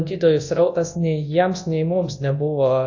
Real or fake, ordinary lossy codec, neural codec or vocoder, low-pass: fake; AAC, 48 kbps; codec, 24 kHz, 0.9 kbps, WavTokenizer, large speech release; 7.2 kHz